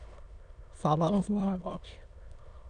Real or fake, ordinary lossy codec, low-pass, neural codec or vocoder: fake; none; 9.9 kHz; autoencoder, 22.05 kHz, a latent of 192 numbers a frame, VITS, trained on many speakers